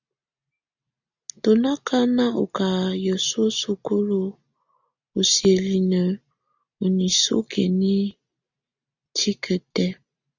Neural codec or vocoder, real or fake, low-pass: none; real; 7.2 kHz